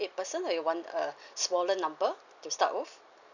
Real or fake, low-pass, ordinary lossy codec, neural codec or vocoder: real; 7.2 kHz; none; none